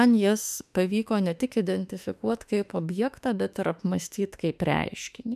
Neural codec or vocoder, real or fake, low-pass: autoencoder, 48 kHz, 32 numbers a frame, DAC-VAE, trained on Japanese speech; fake; 14.4 kHz